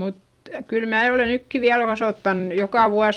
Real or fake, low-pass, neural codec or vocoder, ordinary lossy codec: fake; 19.8 kHz; vocoder, 44.1 kHz, 128 mel bands every 256 samples, BigVGAN v2; Opus, 32 kbps